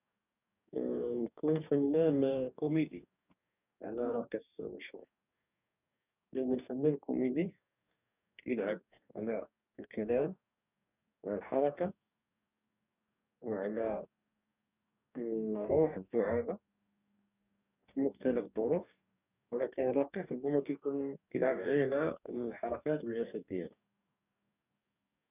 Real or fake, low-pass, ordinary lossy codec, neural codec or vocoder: fake; 3.6 kHz; none; codec, 44.1 kHz, 2.6 kbps, DAC